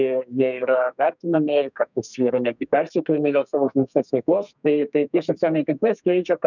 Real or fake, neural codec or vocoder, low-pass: fake; codec, 32 kHz, 1.9 kbps, SNAC; 7.2 kHz